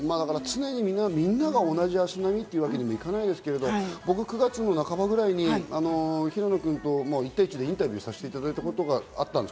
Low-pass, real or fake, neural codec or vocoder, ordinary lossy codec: none; real; none; none